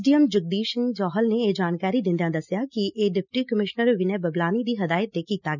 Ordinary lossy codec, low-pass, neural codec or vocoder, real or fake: none; 7.2 kHz; none; real